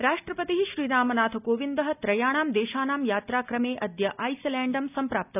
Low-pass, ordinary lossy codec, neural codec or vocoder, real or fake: 3.6 kHz; none; none; real